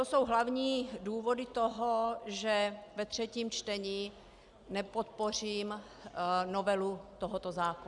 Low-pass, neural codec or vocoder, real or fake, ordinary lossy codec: 10.8 kHz; none; real; Opus, 64 kbps